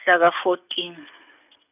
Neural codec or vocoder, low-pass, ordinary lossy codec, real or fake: none; 3.6 kHz; none; real